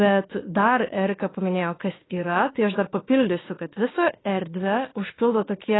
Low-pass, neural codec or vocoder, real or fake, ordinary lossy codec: 7.2 kHz; autoencoder, 48 kHz, 32 numbers a frame, DAC-VAE, trained on Japanese speech; fake; AAC, 16 kbps